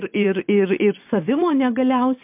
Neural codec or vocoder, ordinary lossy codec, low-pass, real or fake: vocoder, 22.05 kHz, 80 mel bands, WaveNeXt; MP3, 32 kbps; 3.6 kHz; fake